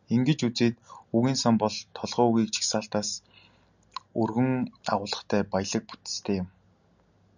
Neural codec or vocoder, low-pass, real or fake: none; 7.2 kHz; real